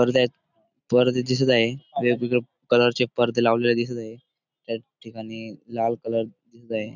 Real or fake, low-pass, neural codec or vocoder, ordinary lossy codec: real; 7.2 kHz; none; none